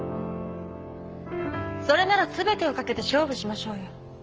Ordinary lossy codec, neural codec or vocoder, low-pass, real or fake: Opus, 24 kbps; codec, 44.1 kHz, 7.8 kbps, Pupu-Codec; 7.2 kHz; fake